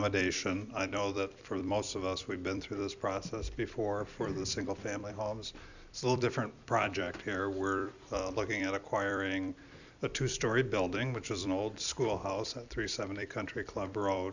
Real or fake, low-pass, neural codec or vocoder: fake; 7.2 kHz; vocoder, 44.1 kHz, 128 mel bands every 256 samples, BigVGAN v2